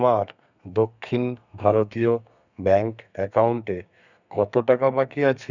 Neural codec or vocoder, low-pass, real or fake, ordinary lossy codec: codec, 32 kHz, 1.9 kbps, SNAC; 7.2 kHz; fake; none